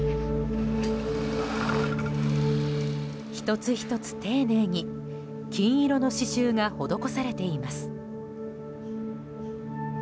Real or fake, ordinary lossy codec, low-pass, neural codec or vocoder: real; none; none; none